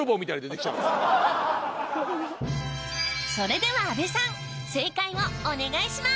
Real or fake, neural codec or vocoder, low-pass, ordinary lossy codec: real; none; none; none